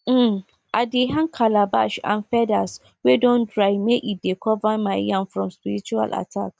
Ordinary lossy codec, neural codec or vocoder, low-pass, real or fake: none; none; none; real